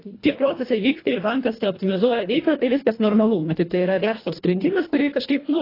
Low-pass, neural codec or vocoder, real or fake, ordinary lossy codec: 5.4 kHz; codec, 24 kHz, 1.5 kbps, HILCodec; fake; AAC, 24 kbps